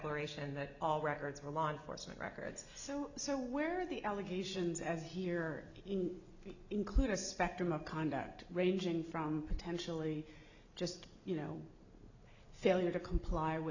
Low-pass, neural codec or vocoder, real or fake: 7.2 kHz; none; real